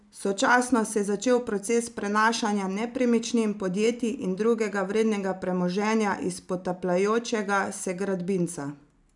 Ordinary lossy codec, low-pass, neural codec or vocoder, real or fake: none; 10.8 kHz; none; real